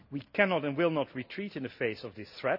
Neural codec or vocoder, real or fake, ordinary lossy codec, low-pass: none; real; none; 5.4 kHz